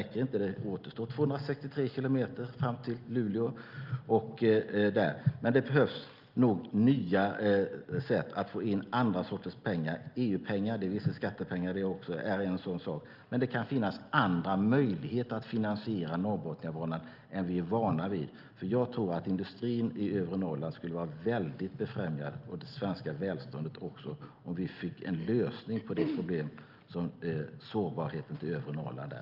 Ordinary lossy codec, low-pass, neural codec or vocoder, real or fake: Opus, 24 kbps; 5.4 kHz; none; real